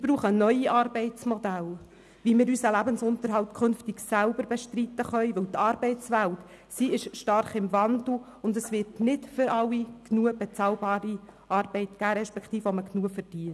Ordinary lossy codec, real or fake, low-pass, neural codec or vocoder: none; real; none; none